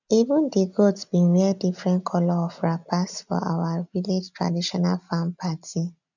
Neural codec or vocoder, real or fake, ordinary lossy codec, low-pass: none; real; none; 7.2 kHz